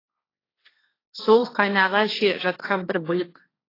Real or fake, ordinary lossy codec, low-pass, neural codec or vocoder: fake; AAC, 24 kbps; 5.4 kHz; codec, 16 kHz, 1.1 kbps, Voila-Tokenizer